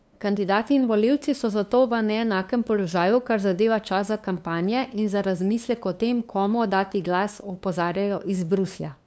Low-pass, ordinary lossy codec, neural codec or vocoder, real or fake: none; none; codec, 16 kHz, 2 kbps, FunCodec, trained on LibriTTS, 25 frames a second; fake